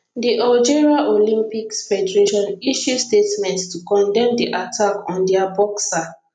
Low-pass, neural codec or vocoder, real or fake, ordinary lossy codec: 9.9 kHz; none; real; none